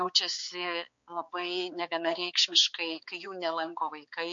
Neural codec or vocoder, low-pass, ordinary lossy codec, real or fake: codec, 16 kHz, 4 kbps, X-Codec, HuBERT features, trained on balanced general audio; 7.2 kHz; MP3, 48 kbps; fake